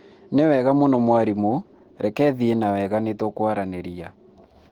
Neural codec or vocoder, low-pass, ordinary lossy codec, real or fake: autoencoder, 48 kHz, 128 numbers a frame, DAC-VAE, trained on Japanese speech; 19.8 kHz; Opus, 16 kbps; fake